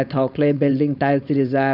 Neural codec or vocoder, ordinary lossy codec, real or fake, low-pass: codec, 16 kHz, 4.8 kbps, FACodec; none; fake; 5.4 kHz